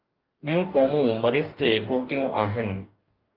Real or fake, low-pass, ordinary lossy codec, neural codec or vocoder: fake; 5.4 kHz; Opus, 32 kbps; codec, 44.1 kHz, 2.6 kbps, DAC